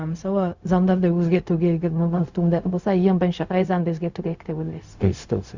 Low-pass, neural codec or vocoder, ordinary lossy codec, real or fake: 7.2 kHz; codec, 16 kHz, 0.4 kbps, LongCat-Audio-Codec; Opus, 64 kbps; fake